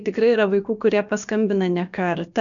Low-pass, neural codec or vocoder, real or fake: 7.2 kHz; codec, 16 kHz, about 1 kbps, DyCAST, with the encoder's durations; fake